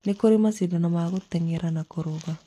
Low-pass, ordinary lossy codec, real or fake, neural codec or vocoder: 14.4 kHz; AAC, 48 kbps; real; none